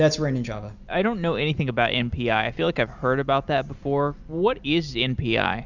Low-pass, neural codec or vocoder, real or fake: 7.2 kHz; none; real